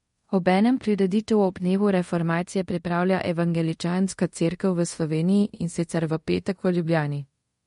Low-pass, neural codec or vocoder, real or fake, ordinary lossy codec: 10.8 kHz; codec, 24 kHz, 0.5 kbps, DualCodec; fake; MP3, 48 kbps